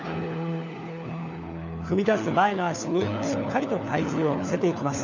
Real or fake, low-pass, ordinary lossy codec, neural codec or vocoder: fake; 7.2 kHz; none; codec, 16 kHz, 4 kbps, FunCodec, trained on LibriTTS, 50 frames a second